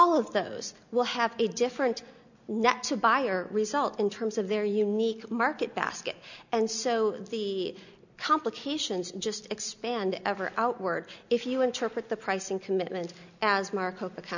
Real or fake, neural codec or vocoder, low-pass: real; none; 7.2 kHz